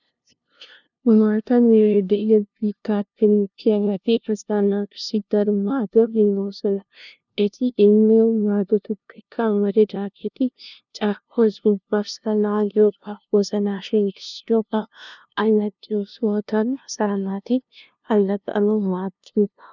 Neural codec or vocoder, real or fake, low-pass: codec, 16 kHz, 0.5 kbps, FunCodec, trained on LibriTTS, 25 frames a second; fake; 7.2 kHz